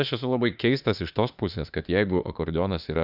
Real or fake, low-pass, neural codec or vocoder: fake; 5.4 kHz; autoencoder, 48 kHz, 32 numbers a frame, DAC-VAE, trained on Japanese speech